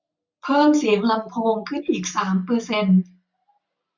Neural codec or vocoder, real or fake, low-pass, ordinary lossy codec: none; real; 7.2 kHz; none